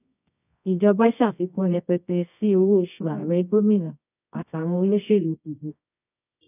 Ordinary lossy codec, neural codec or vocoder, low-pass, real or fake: none; codec, 24 kHz, 0.9 kbps, WavTokenizer, medium music audio release; 3.6 kHz; fake